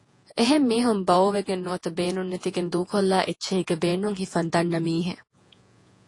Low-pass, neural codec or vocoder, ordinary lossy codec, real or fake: 10.8 kHz; vocoder, 48 kHz, 128 mel bands, Vocos; AAC, 48 kbps; fake